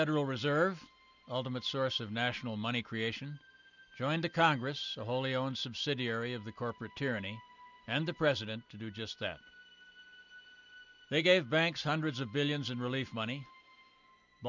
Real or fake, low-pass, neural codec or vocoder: real; 7.2 kHz; none